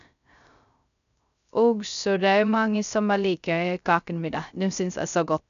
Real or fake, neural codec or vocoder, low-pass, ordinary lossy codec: fake; codec, 16 kHz, 0.3 kbps, FocalCodec; 7.2 kHz; none